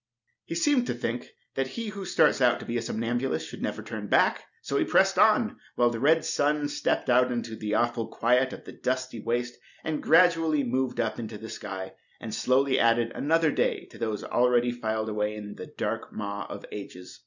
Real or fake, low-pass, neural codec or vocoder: real; 7.2 kHz; none